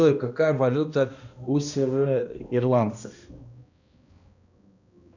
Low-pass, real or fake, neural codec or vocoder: 7.2 kHz; fake; codec, 16 kHz, 1 kbps, X-Codec, HuBERT features, trained on balanced general audio